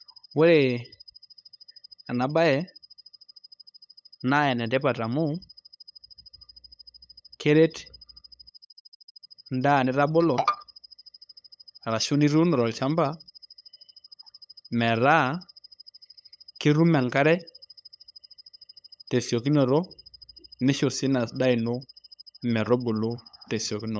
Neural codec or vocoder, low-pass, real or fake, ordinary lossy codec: codec, 16 kHz, 8 kbps, FunCodec, trained on LibriTTS, 25 frames a second; none; fake; none